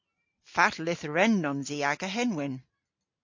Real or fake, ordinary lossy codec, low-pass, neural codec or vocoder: real; MP3, 48 kbps; 7.2 kHz; none